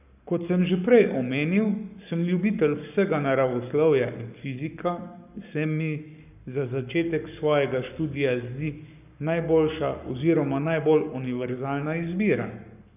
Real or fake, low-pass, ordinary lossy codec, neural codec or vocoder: fake; 3.6 kHz; none; codec, 44.1 kHz, 7.8 kbps, Pupu-Codec